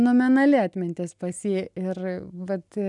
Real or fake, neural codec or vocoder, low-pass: real; none; 10.8 kHz